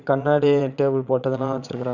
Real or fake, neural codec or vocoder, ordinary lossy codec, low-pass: fake; vocoder, 22.05 kHz, 80 mel bands, Vocos; none; 7.2 kHz